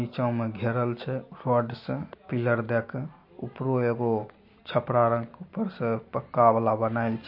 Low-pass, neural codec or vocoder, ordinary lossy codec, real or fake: 5.4 kHz; none; MP3, 32 kbps; real